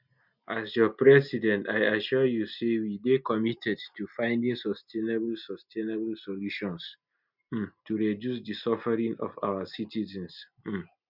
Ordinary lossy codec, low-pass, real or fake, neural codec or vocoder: none; 5.4 kHz; real; none